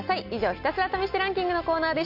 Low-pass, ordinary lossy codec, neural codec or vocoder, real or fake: 5.4 kHz; none; none; real